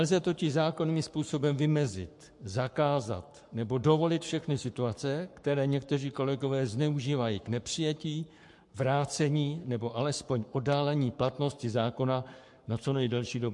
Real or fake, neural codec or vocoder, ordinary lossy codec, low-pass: fake; codec, 44.1 kHz, 7.8 kbps, Pupu-Codec; MP3, 64 kbps; 10.8 kHz